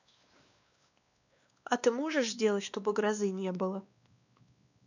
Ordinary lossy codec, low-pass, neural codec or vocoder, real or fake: none; 7.2 kHz; codec, 16 kHz, 2 kbps, X-Codec, WavLM features, trained on Multilingual LibriSpeech; fake